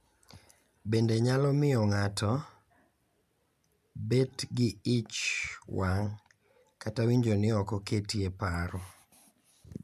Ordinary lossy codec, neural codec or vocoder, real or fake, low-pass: none; none; real; 14.4 kHz